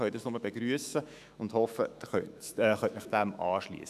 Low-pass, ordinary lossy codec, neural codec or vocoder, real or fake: 14.4 kHz; none; autoencoder, 48 kHz, 128 numbers a frame, DAC-VAE, trained on Japanese speech; fake